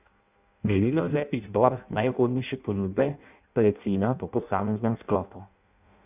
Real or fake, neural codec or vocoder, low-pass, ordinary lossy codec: fake; codec, 16 kHz in and 24 kHz out, 0.6 kbps, FireRedTTS-2 codec; 3.6 kHz; none